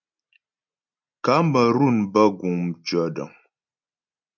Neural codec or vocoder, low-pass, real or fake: none; 7.2 kHz; real